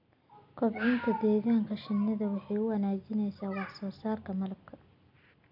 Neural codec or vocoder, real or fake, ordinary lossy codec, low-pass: none; real; none; 5.4 kHz